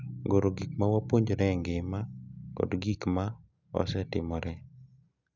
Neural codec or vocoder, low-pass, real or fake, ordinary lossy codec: none; 7.2 kHz; real; none